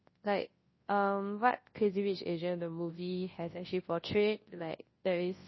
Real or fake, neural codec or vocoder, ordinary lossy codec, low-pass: fake; codec, 24 kHz, 0.9 kbps, WavTokenizer, large speech release; MP3, 24 kbps; 7.2 kHz